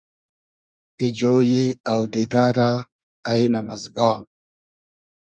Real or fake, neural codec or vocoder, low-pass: fake; codec, 24 kHz, 1 kbps, SNAC; 9.9 kHz